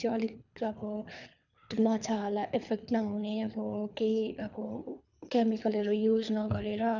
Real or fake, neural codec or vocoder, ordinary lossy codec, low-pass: fake; codec, 24 kHz, 3 kbps, HILCodec; none; 7.2 kHz